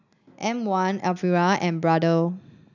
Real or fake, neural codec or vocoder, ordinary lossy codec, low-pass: real; none; none; 7.2 kHz